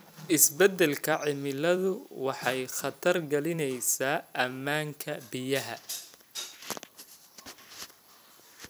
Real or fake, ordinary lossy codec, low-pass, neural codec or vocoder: fake; none; none; vocoder, 44.1 kHz, 128 mel bands every 256 samples, BigVGAN v2